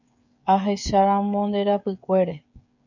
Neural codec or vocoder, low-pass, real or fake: codec, 16 kHz, 16 kbps, FreqCodec, smaller model; 7.2 kHz; fake